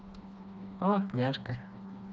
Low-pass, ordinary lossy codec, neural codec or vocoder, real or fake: none; none; codec, 16 kHz, 2 kbps, FreqCodec, smaller model; fake